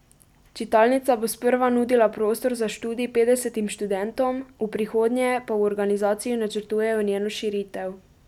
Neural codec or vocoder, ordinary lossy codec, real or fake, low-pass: none; none; real; 19.8 kHz